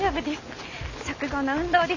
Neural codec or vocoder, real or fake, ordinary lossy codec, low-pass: none; real; none; 7.2 kHz